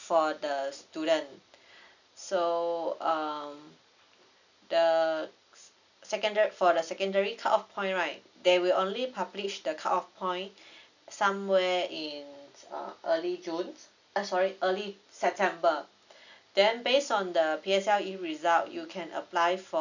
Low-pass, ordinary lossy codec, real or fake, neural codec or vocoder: 7.2 kHz; none; real; none